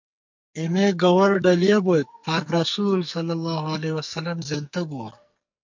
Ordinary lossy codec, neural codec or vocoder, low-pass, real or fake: MP3, 48 kbps; codec, 44.1 kHz, 2.6 kbps, SNAC; 7.2 kHz; fake